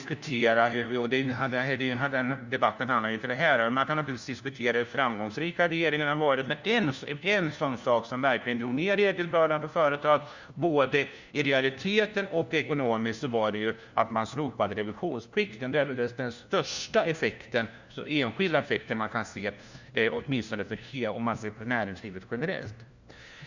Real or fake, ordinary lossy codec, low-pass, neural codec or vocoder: fake; Opus, 64 kbps; 7.2 kHz; codec, 16 kHz, 1 kbps, FunCodec, trained on LibriTTS, 50 frames a second